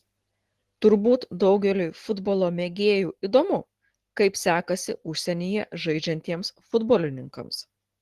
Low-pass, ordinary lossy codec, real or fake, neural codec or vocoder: 14.4 kHz; Opus, 16 kbps; real; none